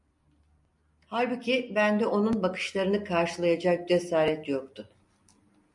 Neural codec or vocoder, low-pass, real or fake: none; 10.8 kHz; real